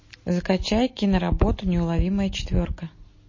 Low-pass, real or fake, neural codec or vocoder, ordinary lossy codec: 7.2 kHz; real; none; MP3, 32 kbps